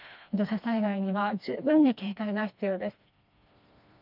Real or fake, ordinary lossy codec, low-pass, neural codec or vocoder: fake; none; 5.4 kHz; codec, 16 kHz, 2 kbps, FreqCodec, smaller model